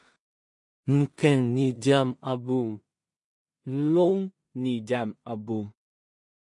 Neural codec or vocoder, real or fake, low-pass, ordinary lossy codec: codec, 16 kHz in and 24 kHz out, 0.4 kbps, LongCat-Audio-Codec, two codebook decoder; fake; 10.8 kHz; MP3, 48 kbps